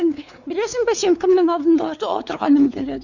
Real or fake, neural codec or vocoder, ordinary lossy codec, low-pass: fake; codec, 16 kHz, 4 kbps, X-Codec, WavLM features, trained on Multilingual LibriSpeech; none; 7.2 kHz